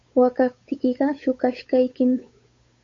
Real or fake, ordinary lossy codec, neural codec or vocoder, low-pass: fake; MP3, 48 kbps; codec, 16 kHz, 8 kbps, FunCodec, trained on Chinese and English, 25 frames a second; 7.2 kHz